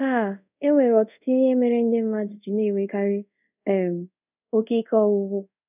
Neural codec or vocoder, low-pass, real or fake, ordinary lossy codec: codec, 24 kHz, 0.5 kbps, DualCodec; 3.6 kHz; fake; none